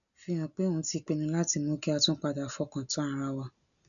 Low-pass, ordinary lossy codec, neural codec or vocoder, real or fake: 7.2 kHz; none; none; real